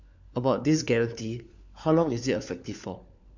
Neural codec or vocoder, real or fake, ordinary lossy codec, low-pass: codec, 16 kHz, 4 kbps, FunCodec, trained on LibriTTS, 50 frames a second; fake; none; 7.2 kHz